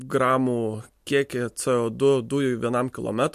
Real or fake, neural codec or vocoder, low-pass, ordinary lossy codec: real; none; 14.4 kHz; MP3, 64 kbps